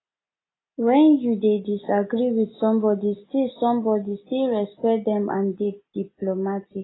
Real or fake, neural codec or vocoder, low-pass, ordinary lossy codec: real; none; 7.2 kHz; AAC, 16 kbps